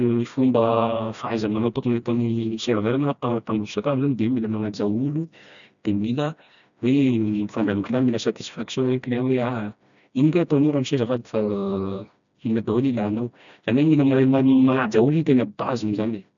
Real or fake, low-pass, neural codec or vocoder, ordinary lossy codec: fake; 7.2 kHz; codec, 16 kHz, 1 kbps, FreqCodec, smaller model; none